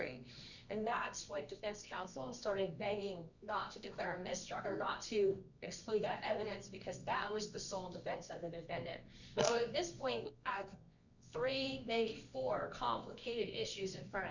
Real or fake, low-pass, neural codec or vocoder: fake; 7.2 kHz; codec, 24 kHz, 0.9 kbps, WavTokenizer, medium music audio release